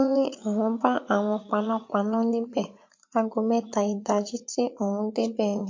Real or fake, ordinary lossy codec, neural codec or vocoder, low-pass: fake; MP3, 48 kbps; vocoder, 44.1 kHz, 80 mel bands, Vocos; 7.2 kHz